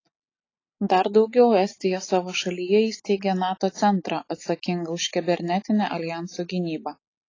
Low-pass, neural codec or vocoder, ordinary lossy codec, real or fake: 7.2 kHz; none; AAC, 32 kbps; real